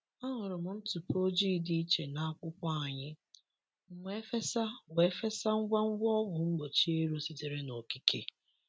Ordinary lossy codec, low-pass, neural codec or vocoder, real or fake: none; none; none; real